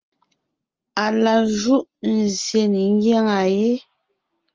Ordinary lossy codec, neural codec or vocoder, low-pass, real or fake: Opus, 24 kbps; none; 7.2 kHz; real